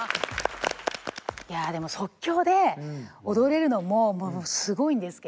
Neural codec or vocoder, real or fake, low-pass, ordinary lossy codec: none; real; none; none